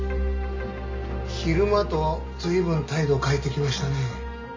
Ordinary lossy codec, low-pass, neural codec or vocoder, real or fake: MP3, 48 kbps; 7.2 kHz; none; real